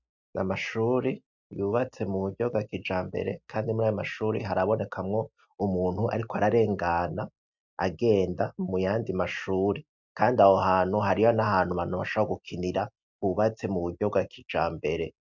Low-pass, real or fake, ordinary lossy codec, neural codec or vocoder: 7.2 kHz; real; MP3, 64 kbps; none